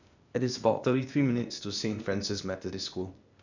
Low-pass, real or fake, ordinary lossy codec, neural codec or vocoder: 7.2 kHz; fake; none; codec, 16 kHz, 0.8 kbps, ZipCodec